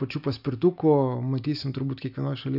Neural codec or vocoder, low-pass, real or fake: none; 5.4 kHz; real